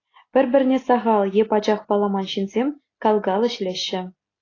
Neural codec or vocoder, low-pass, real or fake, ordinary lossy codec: none; 7.2 kHz; real; AAC, 32 kbps